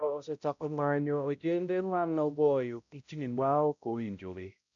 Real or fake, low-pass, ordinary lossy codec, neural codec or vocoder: fake; 7.2 kHz; none; codec, 16 kHz, 0.5 kbps, X-Codec, HuBERT features, trained on balanced general audio